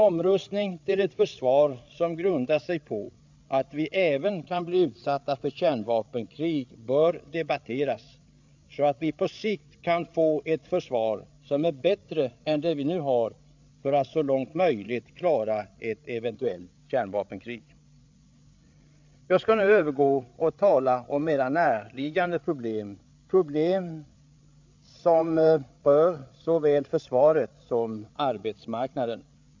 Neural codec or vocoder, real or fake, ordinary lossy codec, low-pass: codec, 16 kHz, 8 kbps, FreqCodec, larger model; fake; MP3, 64 kbps; 7.2 kHz